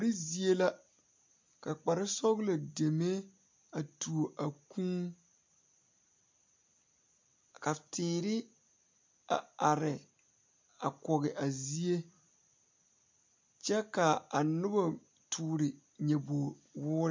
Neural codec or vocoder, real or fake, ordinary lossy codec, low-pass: none; real; MP3, 48 kbps; 7.2 kHz